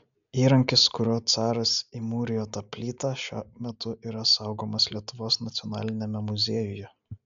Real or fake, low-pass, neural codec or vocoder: real; 7.2 kHz; none